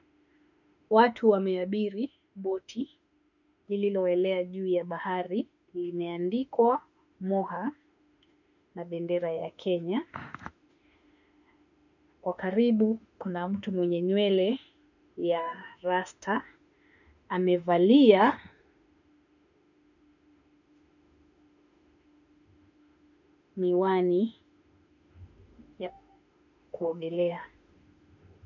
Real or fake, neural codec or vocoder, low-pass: fake; autoencoder, 48 kHz, 32 numbers a frame, DAC-VAE, trained on Japanese speech; 7.2 kHz